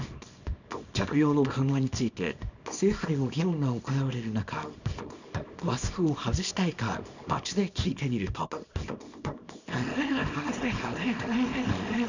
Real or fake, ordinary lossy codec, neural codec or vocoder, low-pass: fake; none; codec, 24 kHz, 0.9 kbps, WavTokenizer, small release; 7.2 kHz